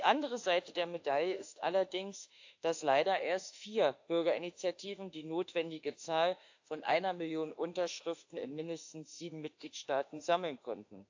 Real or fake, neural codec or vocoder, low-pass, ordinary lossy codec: fake; autoencoder, 48 kHz, 32 numbers a frame, DAC-VAE, trained on Japanese speech; 7.2 kHz; AAC, 48 kbps